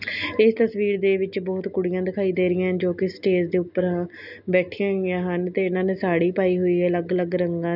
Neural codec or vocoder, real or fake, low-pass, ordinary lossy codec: none; real; 5.4 kHz; none